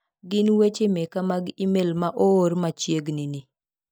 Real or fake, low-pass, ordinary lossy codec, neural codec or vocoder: real; none; none; none